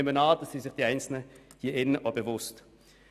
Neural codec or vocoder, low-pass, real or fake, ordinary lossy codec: none; 14.4 kHz; real; none